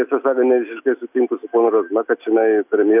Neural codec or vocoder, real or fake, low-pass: none; real; 3.6 kHz